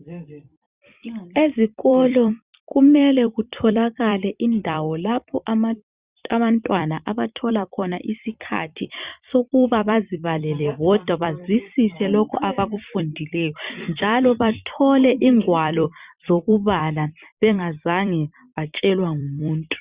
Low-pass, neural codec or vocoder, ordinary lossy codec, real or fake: 3.6 kHz; vocoder, 44.1 kHz, 128 mel bands every 256 samples, BigVGAN v2; Opus, 64 kbps; fake